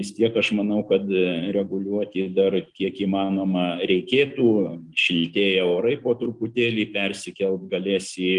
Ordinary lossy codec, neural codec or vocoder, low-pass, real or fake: Opus, 24 kbps; none; 10.8 kHz; real